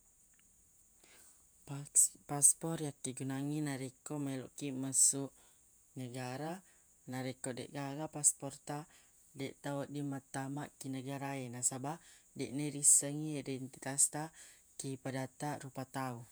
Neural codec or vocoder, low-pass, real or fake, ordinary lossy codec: vocoder, 48 kHz, 128 mel bands, Vocos; none; fake; none